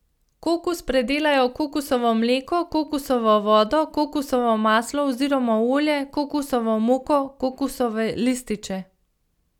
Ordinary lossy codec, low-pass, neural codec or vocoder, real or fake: none; 19.8 kHz; none; real